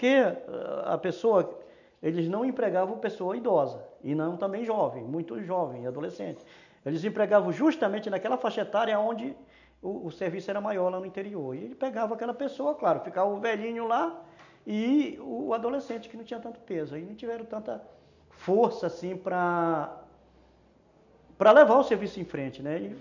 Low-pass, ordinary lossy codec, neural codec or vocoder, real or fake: 7.2 kHz; none; none; real